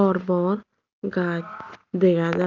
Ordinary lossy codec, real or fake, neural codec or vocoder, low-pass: Opus, 24 kbps; real; none; 7.2 kHz